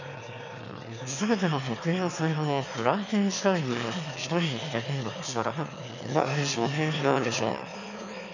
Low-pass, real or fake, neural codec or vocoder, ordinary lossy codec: 7.2 kHz; fake; autoencoder, 22.05 kHz, a latent of 192 numbers a frame, VITS, trained on one speaker; none